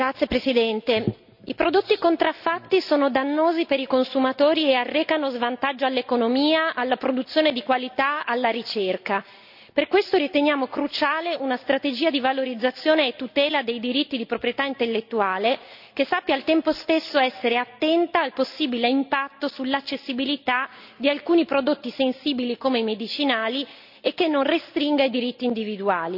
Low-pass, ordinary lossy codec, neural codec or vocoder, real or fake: 5.4 kHz; none; none; real